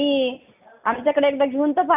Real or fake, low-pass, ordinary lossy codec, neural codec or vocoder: real; 3.6 kHz; none; none